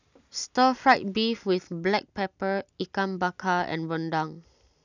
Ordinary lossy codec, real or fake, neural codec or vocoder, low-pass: none; real; none; 7.2 kHz